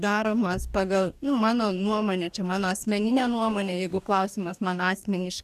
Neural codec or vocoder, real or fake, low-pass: codec, 44.1 kHz, 2.6 kbps, DAC; fake; 14.4 kHz